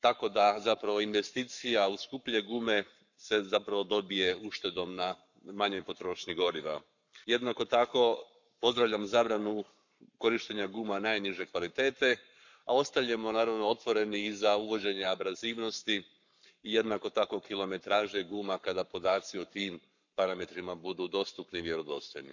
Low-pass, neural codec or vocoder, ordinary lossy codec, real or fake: 7.2 kHz; codec, 44.1 kHz, 7.8 kbps, Pupu-Codec; none; fake